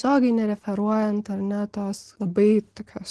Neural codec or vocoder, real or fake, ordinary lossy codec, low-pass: none; real; Opus, 16 kbps; 10.8 kHz